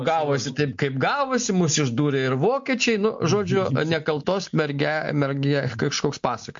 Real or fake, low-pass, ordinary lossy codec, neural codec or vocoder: real; 7.2 kHz; MP3, 48 kbps; none